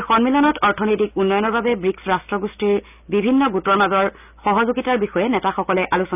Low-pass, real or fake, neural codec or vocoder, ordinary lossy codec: 3.6 kHz; real; none; none